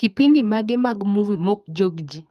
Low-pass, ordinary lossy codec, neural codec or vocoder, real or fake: 14.4 kHz; Opus, 32 kbps; codec, 32 kHz, 1.9 kbps, SNAC; fake